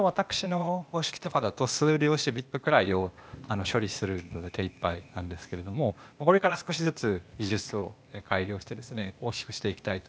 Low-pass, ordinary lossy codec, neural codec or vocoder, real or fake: none; none; codec, 16 kHz, 0.8 kbps, ZipCodec; fake